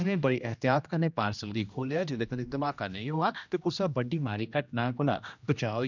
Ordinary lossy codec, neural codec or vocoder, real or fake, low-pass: none; codec, 16 kHz, 1 kbps, X-Codec, HuBERT features, trained on general audio; fake; 7.2 kHz